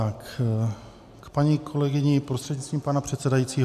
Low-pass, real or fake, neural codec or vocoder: 14.4 kHz; real; none